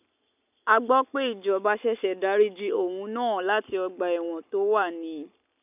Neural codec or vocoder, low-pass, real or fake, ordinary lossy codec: none; 3.6 kHz; real; none